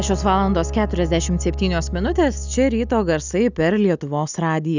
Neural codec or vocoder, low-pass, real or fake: none; 7.2 kHz; real